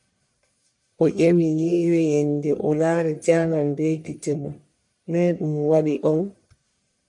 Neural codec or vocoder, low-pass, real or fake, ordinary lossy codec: codec, 44.1 kHz, 1.7 kbps, Pupu-Codec; 10.8 kHz; fake; MP3, 64 kbps